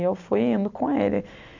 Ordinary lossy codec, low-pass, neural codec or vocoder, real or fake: AAC, 48 kbps; 7.2 kHz; none; real